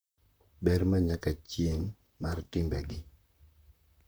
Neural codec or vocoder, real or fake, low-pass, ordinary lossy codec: vocoder, 44.1 kHz, 128 mel bands, Pupu-Vocoder; fake; none; none